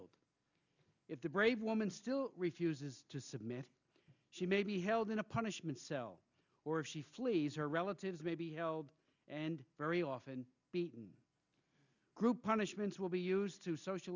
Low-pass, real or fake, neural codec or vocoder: 7.2 kHz; real; none